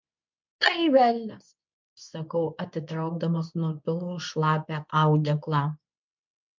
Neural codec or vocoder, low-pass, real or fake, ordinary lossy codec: codec, 24 kHz, 0.9 kbps, WavTokenizer, medium speech release version 2; 7.2 kHz; fake; MP3, 64 kbps